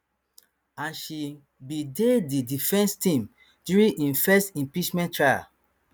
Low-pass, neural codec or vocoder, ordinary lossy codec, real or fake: none; none; none; real